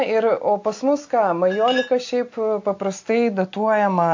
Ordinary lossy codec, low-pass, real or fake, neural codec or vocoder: AAC, 48 kbps; 7.2 kHz; real; none